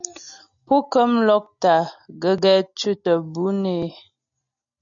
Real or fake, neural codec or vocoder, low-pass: real; none; 7.2 kHz